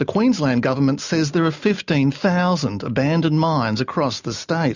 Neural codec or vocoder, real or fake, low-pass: none; real; 7.2 kHz